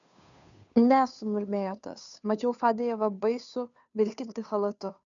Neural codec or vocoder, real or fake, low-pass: codec, 16 kHz, 2 kbps, FunCodec, trained on Chinese and English, 25 frames a second; fake; 7.2 kHz